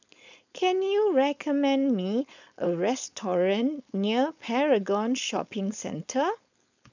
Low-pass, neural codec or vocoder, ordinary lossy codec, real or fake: 7.2 kHz; codec, 16 kHz, 4.8 kbps, FACodec; none; fake